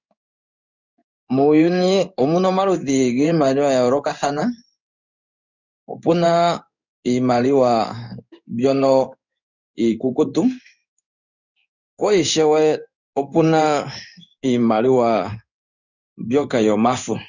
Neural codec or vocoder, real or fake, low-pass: codec, 16 kHz in and 24 kHz out, 1 kbps, XY-Tokenizer; fake; 7.2 kHz